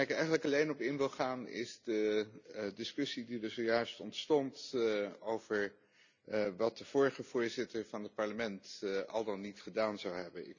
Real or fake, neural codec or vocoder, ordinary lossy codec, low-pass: real; none; none; 7.2 kHz